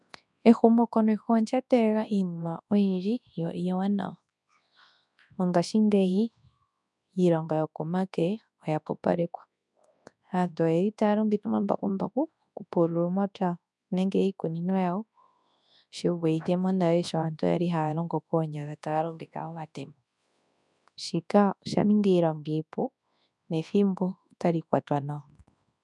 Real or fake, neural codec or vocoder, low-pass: fake; codec, 24 kHz, 0.9 kbps, WavTokenizer, large speech release; 10.8 kHz